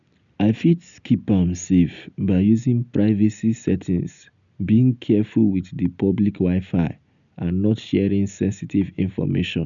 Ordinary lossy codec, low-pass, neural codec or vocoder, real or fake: none; 7.2 kHz; none; real